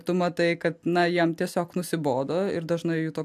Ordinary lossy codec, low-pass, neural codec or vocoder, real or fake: AAC, 96 kbps; 14.4 kHz; none; real